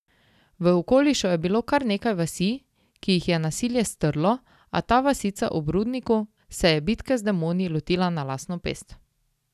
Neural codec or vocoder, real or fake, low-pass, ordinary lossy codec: none; real; 14.4 kHz; none